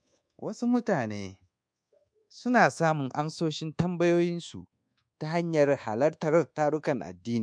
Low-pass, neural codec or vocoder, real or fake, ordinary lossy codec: 9.9 kHz; codec, 24 kHz, 1.2 kbps, DualCodec; fake; MP3, 64 kbps